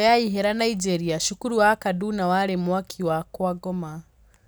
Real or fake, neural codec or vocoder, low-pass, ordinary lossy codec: real; none; none; none